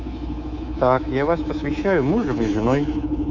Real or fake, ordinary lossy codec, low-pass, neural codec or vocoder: fake; AAC, 48 kbps; 7.2 kHz; codec, 24 kHz, 3.1 kbps, DualCodec